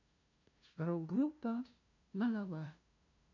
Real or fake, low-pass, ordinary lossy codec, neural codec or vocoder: fake; 7.2 kHz; none; codec, 16 kHz, 0.5 kbps, FunCodec, trained on LibriTTS, 25 frames a second